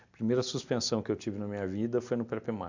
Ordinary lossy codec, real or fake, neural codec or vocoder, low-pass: MP3, 64 kbps; real; none; 7.2 kHz